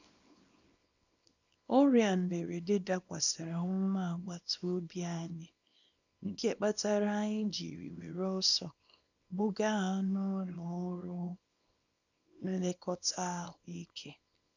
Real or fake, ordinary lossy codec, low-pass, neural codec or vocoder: fake; none; 7.2 kHz; codec, 24 kHz, 0.9 kbps, WavTokenizer, small release